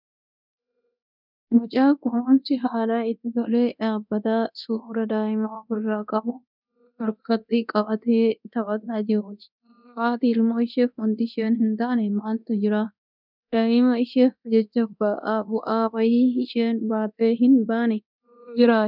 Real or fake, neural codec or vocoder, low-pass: fake; codec, 24 kHz, 0.9 kbps, DualCodec; 5.4 kHz